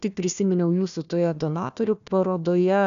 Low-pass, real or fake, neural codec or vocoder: 7.2 kHz; fake; codec, 16 kHz, 1 kbps, FunCodec, trained on Chinese and English, 50 frames a second